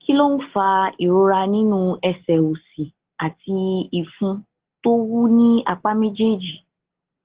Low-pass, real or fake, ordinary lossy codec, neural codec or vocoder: 3.6 kHz; real; Opus, 16 kbps; none